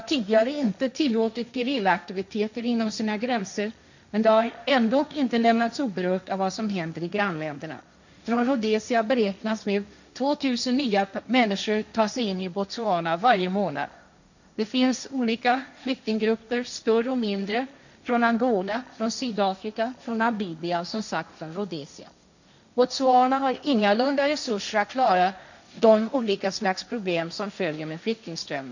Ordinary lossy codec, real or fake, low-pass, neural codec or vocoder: none; fake; 7.2 kHz; codec, 16 kHz, 1.1 kbps, Voila-Tokenizer